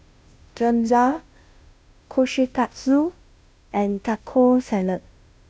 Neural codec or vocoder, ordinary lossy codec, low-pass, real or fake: codec, 16 kHz, 0.5 kbps, FunCodec, trained on Chinese and English, 25 frames a second; none; none; fake